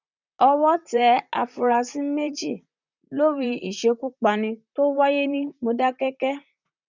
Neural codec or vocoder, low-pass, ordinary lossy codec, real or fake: vocoder, 44.1 kHz, 128 mel bands, Pupu-Vocoder; 7.2 kHz; none; fake